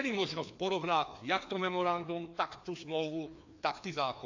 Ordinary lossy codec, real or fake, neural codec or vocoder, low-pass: AAC, 48 kbps; fake; codec, 16 kHz, 2 kbps, FreqCodec, larger model; 7.2 kHz